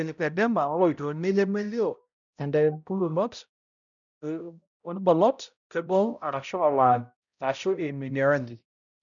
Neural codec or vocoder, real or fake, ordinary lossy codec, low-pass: codec, 16 kHz, 0.5 kbps, X-Codec, HuBERT features, trained on balanced general audio; fake; none; 7.2 kHz